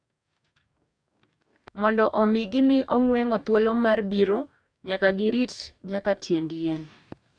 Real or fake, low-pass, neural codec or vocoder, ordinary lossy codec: fake; 9.9 kHz; codec, 44.1 kHz, 2.6 kbps, DAC; none